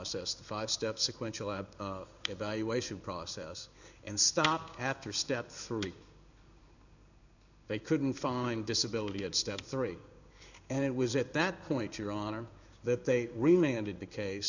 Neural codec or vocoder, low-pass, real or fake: codec, 16 kHz in and 24 kHz out, 1 kbps, XY-Tokenizer; 7.2 kHz; fake